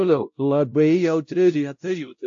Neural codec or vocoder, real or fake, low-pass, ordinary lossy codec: codec, 16 kHz, 0.5 kbps, X-Codec, WavLM features, trained on Multilingual LibriSpeech; fake; 7.2 kHz; AAC, 64 kbps